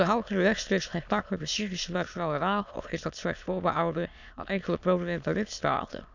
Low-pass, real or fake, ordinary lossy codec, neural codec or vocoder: 7.2 kHz; fake; none; autoencoder, 22.05 kHz, a latent of 192 numbers a frame, VITS, trained on many speakers